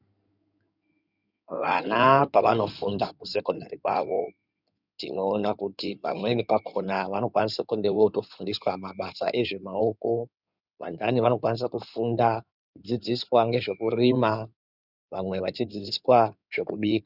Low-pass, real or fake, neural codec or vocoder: 5.4 kHz; fake; codec, 16 kHz in and 24 kHz out, 2.2 kbps, FireRedTTS-2 codec